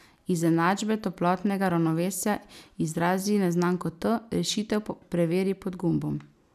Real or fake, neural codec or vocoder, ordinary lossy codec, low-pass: real; none; none; 14.4 kHz